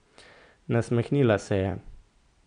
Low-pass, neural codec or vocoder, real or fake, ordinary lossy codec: 9.9 kHz; none; real; none